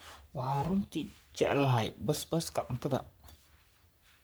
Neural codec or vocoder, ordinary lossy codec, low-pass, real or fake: codec, 44.1 kHz, 3.4 kbps, Pupu-Codec; none; none; fake